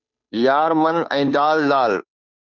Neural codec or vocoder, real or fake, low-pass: codec, 16 kHz, 2 kbps, FunCodec, trained on Chinese and English, 25 frames a second; fake; 7.2 kHz